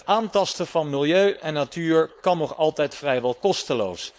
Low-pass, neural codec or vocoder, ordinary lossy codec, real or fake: none; codec, 16 kHz, 4.8 kbps, FACodec; none; fake